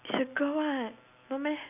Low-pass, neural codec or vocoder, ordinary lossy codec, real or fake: 3.6 kHz; none; none; real